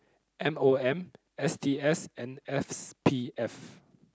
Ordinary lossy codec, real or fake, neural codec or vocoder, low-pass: none; real; none; none